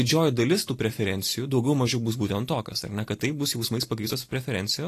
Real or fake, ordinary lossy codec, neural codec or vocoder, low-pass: real; AAC, 48 kbps; none; 14.4 kHz